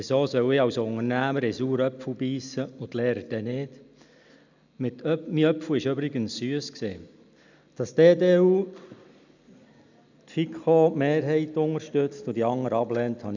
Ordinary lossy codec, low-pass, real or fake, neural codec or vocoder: none; 7.2 kHz; real; none